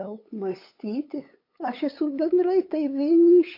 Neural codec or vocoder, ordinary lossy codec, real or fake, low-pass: codec, 16 kHz, 8 kbps, FunCodec, trained on LibriTTS, 25 frames a second; MP3, 32 kbps; fake; 5.4 kHz